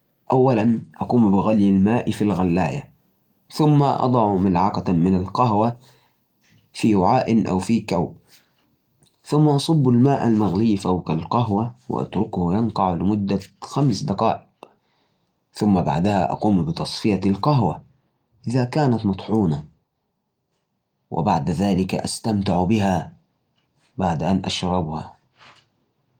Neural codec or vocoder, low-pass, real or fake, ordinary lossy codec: none; 19.8 kHz; real; Opus, 32 kbps